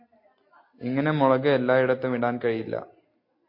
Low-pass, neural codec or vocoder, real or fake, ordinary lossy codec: 5.4 kHz; none; real; MP3, 32 kbps